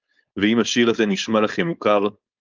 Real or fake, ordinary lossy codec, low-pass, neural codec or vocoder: fake; Opus, 24 kbps; 7.2 kHz; codec, 16 kHz, 4.8 kbps, FACodec